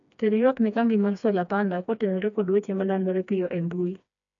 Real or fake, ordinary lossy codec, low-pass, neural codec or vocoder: fake; none; 7.2 kHz; codec, 16 kHz, 2 kbps, FreqCodec, smaller model